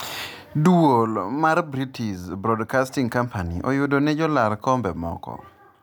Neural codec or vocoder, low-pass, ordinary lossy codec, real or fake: none; none; none; real